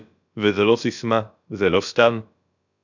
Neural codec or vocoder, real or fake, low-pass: codec, 16 kHz, about 1 kbps, DyCAST, with the encoder's durations; fake; 7.2 kHz